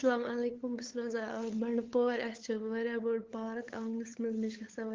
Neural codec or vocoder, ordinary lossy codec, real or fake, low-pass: codec, 16 kHz, 8 kbps, FunCodec, trained on LibriTTS, 25 frames a second; Opus, 16 kbps; fake; 7.2 kHz